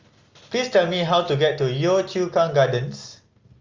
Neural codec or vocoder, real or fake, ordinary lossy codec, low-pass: none; real; Opus, 32 kbps; 7.2 kHz